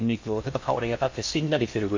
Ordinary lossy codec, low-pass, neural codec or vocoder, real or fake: MP3, 48 kbps; 7.2 kHz; codec, 16 kHz in and 24 kHz out, 0.6 kbps, FocalCodec, streaming, 4096 codes; fake